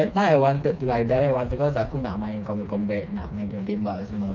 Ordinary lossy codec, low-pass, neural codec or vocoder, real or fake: none; 7.2 kHz; codec, 16 kHz, 2 kbps, FreqCodec, smaller model; fake